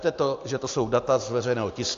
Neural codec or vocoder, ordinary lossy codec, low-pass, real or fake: none; AAC, 48 kbps; 7.2 kHz; real